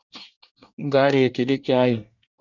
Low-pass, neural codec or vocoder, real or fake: 7.2 kHz; codec, 24 kHz, 1 kbps, SNAC; fake